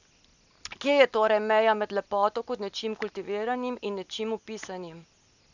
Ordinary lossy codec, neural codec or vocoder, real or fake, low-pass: none; none; real; 7.2 kHz